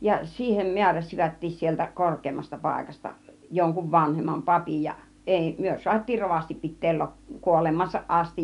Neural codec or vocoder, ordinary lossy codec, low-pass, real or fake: none; none; 10.8 kHz; real